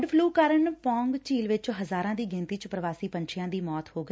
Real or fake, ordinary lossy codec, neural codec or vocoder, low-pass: real; none; none; none